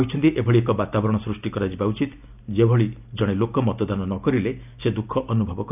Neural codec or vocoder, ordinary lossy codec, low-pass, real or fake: none; none; 3.6 kHz; real